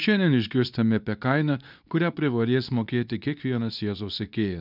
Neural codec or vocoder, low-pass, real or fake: codec, 24 kHz, 0.9 kbps, WavTokenizer, medium speech release version 2; 5.4 kHz; fake